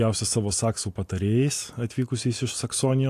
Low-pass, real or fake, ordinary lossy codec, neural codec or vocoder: 14.4 kHz; real; AAC, 64 kbps; none